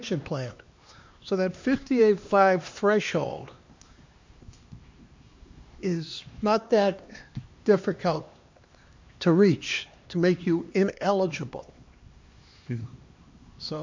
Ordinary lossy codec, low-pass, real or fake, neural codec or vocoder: MP3, 48 kbps; 7.2 kHz; fake; codec, 16 kHz, 2 kbps, X-Codec, HuBERT features, trained on LibriSpeech